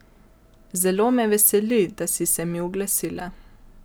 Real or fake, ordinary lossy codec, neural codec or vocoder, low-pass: real; none; none; none